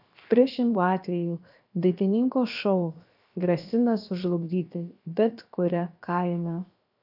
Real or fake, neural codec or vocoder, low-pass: fake; codec, 16 kHz, 0.7 kbps, FocalCodec; 5.4 kHz